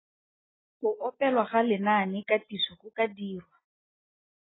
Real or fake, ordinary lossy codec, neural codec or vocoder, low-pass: real; AAC, 16 kbps; none; 7.2 kHz